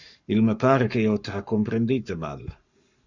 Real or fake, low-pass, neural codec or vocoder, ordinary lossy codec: fake; 7.2 kHz; codec, 44.1 kHz, 7.8 kbps, Pupu-Codec; Opus, 64 kbps